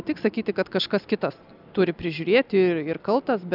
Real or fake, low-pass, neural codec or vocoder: real; 5.4 kHz; none